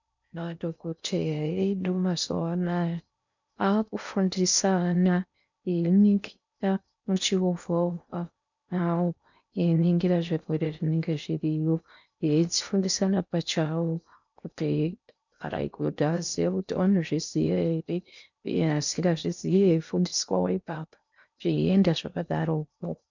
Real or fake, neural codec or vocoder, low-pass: fake; codec, 16 kHz in and 24 kHz out, 0.6 kbps, FocalCodec, streaming, 4096 codes; 7.2 kHz